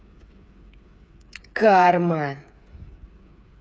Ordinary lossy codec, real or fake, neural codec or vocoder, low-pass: none; fake; codec, 16 kHz, 8 kbps, FreqCodec, smaller model; none